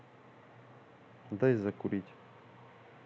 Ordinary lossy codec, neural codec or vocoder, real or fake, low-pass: none; none; real; none